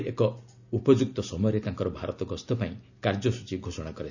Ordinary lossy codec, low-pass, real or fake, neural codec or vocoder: none; 7.2 kHz; real; none